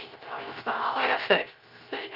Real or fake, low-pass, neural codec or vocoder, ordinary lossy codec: fake; 5.4 kHz; codec, 16 kHz, 0.3 kbps, FocalCodec; Opus, 32 kbps